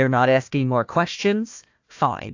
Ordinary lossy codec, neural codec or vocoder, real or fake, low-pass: AAC, 48 kbps; codec, 16 kHz, 1 kbps, FunCodec, trained on Chinese and English, 50 frames a second; fake; 7.2 kHz